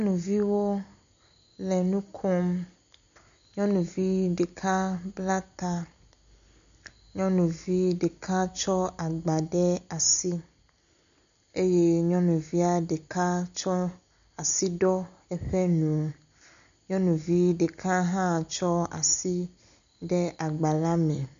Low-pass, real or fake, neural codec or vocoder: 7.2 kHz; real; none